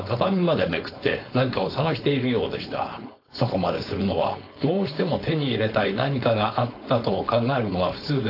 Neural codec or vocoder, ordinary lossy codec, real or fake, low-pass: codec, 16 kHz, 4.8 kbps, FACodec; AAC, 32 kbps; fake; 5.4 kHz